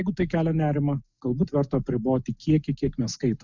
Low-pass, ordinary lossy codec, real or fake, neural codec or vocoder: 7.2 kHz; Opus, 64 kbps; real; none